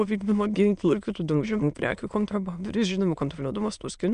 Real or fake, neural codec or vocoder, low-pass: fake; autoencoder, 22.05 kHz, a latent of 192 numbers a frame, VITS, trained on many speakers; 9.9 kHz